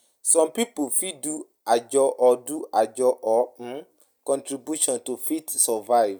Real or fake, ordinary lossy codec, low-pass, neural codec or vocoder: real; none; none; none